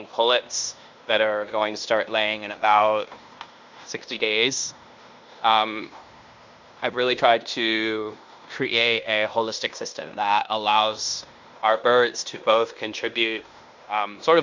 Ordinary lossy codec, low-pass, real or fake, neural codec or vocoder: MP3, 64 kbps; 7.2 kHz; fake; codec, 16 kHz in and 24 kHz out, 0.9 kbps, LongCat-Audio-Codec, fine tuned four codebook decoder